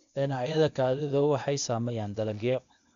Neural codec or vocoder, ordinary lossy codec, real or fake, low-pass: codec, 16 kHz, 0.8 kbps, ZipCodec; MP3, 64 kbps; fake; 7.2 kHz